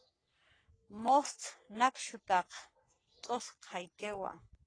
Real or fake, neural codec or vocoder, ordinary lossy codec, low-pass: fake; codec, 44.1 kHz, 3.4 kbps, Pupu-Codec; AAC, 32 kbps; 9.9 kHz